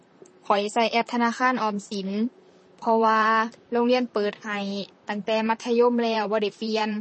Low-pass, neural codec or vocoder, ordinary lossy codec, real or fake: 10.8 kHz; vocoder, 44.1 kHz, 128 mel bands, Pupu-Vocoder; MP3, 32 kbps; fake